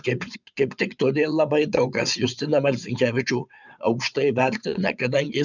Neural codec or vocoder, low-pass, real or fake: vocoder, 22.05 kHz, 80 mel bands, WaveNeXt; 7.2 kHz; fake